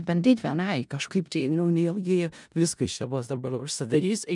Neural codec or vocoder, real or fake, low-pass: codec, 16 kHz in and 24 kHz out, 0.4 kbps, LongCat-Audio-Codec, four codebook decoder; fake; 10.8 kHz